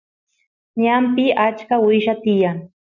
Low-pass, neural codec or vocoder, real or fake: 7.2 kHz; none; real